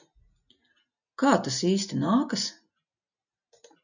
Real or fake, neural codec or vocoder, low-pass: real; none; 7.2 kHz